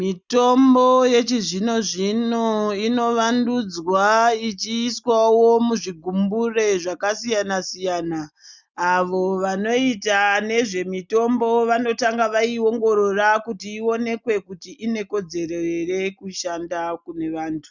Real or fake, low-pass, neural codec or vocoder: real; 7.2 kHz; none